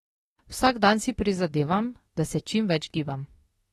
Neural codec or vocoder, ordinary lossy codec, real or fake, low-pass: autoencoder, 48 kHz, 32 numbers a frame, DAC-VAE, trained on Japanese speech; AAC, 32 kbps; fake; 19.8 kHz